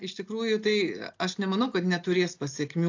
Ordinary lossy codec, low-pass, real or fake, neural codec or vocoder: AAC, 48 kbps; 7.2 kHz; real; none